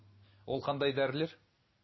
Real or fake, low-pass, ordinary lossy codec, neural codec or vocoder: real; 7.2 kHz; MP3, 24 kbps; none